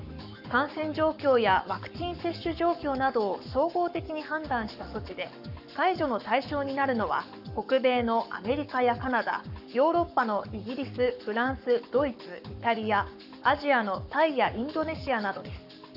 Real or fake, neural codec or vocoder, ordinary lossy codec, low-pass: fake; codec, 44.1 kHz, 7.8 kbps, Pupu-Codec; none; 5.4 kHz